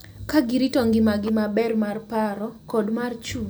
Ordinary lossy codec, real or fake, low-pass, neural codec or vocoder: none; real; none; none